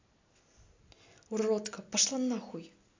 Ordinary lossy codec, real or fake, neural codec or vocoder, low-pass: AAC, 48 kbps; real; none; 7.2 kHz